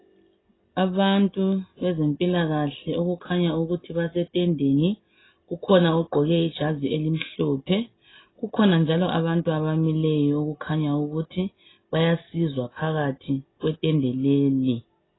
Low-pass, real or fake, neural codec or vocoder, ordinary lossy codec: 7.2 kHz; real; none; AAC, 16 kbps